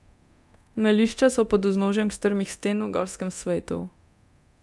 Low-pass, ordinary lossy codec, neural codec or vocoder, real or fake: none; none; codec, 24 kHz, 0.9 kbps, DualCodec; fake